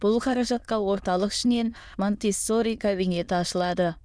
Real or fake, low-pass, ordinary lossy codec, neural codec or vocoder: fake; none; none; autoencoder, 22.05 kHz, a latent of 192 numbers a frame, VITS, trained on many speakers